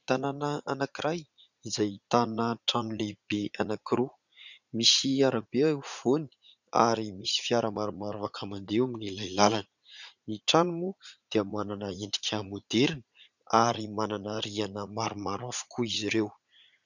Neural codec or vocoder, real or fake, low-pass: vocoder, 44.1 kHz, 80 mel bands, Vocos; fake; 7.2 kHz